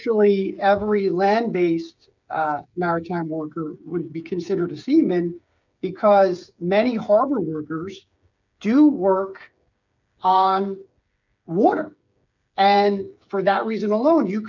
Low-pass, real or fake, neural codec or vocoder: 7.2 kHz; fake; codec, 16 kHz, 8 kbps, FreqCodec, smaller model